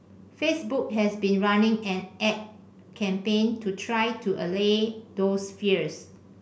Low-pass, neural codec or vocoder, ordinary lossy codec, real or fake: none; none; none; real